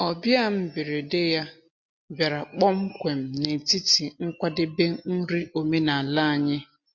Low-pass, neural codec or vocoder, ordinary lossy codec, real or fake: 7.2 kHz; none; MP3, 48 kbps; real